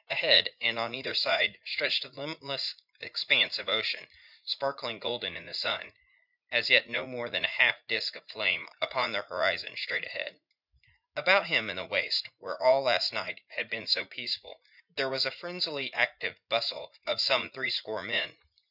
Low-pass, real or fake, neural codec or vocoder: 5.4 kHz; fake; vocoder, 44.1 kHz, 80 mel bands, Vocos